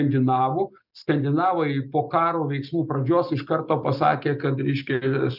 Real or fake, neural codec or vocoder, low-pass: real; none; 5.4 kHz